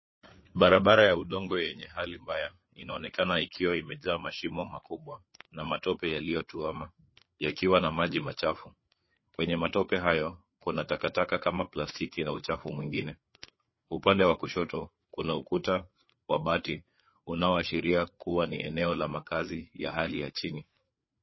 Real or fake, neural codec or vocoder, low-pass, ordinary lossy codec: fake; codec, 16 kHz, 4 kbps, FreqCodec, larger model; 7.2 kHz; MP3, 24 kbps